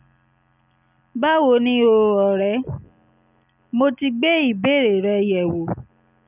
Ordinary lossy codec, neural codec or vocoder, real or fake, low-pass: none; none; real; 3.6 kHz